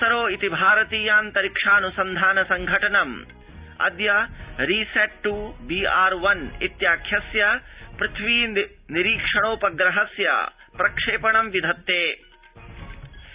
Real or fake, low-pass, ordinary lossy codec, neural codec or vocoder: real; 3.6 kHz; Opus, 64 kbps; none